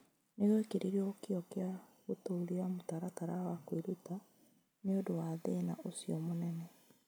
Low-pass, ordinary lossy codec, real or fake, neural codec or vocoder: none; none; real; none